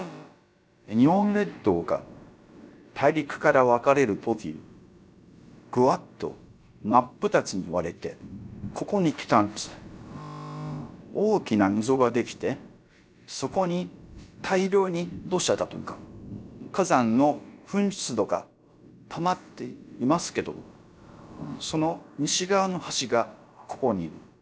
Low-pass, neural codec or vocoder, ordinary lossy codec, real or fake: none; codec, 16 kHz, about 1 kbps, DyCAST, with the encoder's durations; none; fake